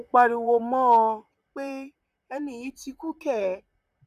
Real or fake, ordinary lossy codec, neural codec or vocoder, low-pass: fake; none; vocoder, 44.1 kHz, 128 mel bands, Pupu-Vocoder; 14.4 kHz